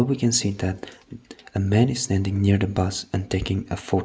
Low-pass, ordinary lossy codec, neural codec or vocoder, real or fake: none; none; none; real